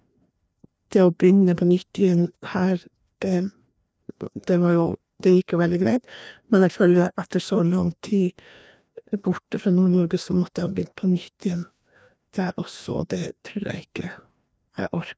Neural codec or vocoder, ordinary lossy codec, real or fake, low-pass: codec, 16 kHz, 1 kbps, FreqCodec, larger model; none; fake; none